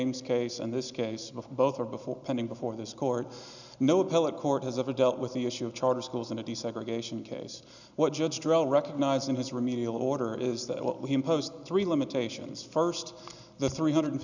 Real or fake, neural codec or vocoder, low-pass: real; none; 7.2 kHz